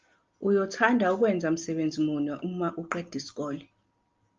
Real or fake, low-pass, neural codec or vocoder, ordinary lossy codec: real; 7.2 kHz; none; Opus, 32 kbps